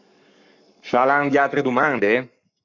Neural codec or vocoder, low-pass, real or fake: codec, 44.1 kHz, 7.8 kbps, Pupu-Codec; 7.2 kHz; fake